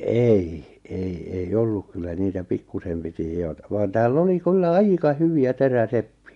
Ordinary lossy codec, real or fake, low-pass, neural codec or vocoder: MP3, 64 kbps; real; 10.8 kHz; none